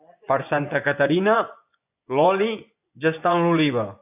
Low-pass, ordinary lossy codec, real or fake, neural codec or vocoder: 3.6 kHz; AAC, 32 kbps; fake; vocoder, 24 kHz, 100 mel bands, Vocos